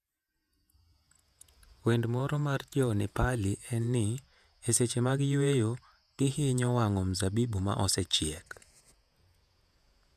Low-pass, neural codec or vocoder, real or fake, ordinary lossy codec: 14.4 kHz; vocoder, 48 kHz, 128 mel bands, Vocos; fake; none